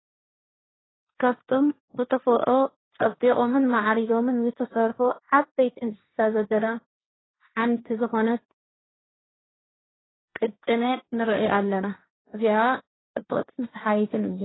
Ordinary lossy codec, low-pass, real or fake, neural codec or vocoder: AAC, 16 kbps; 7.2 kHz; fake; codec, 16 kHz, 1.1 kbps, Voila-Tokenizer